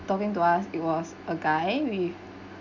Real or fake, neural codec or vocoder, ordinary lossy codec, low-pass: real; none; none; 7.2 kHz